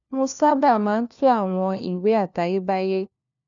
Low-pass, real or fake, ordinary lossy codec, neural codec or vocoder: 7.2 kHz; fake; none; codec, 16 kHz, 1 kbps, FunCodec, trained on LibriTTS, 50 frames a second